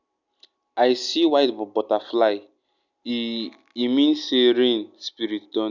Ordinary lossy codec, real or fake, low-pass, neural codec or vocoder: none; real; 7.2 kHz; none